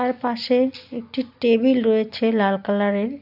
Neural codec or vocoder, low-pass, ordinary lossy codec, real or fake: none; 5.4 kHz; none; real